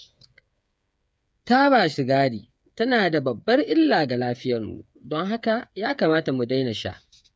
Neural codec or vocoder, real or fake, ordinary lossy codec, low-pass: codec, 16 kHz, 8 kbps, FreqCodec, smaller model; fake; none; none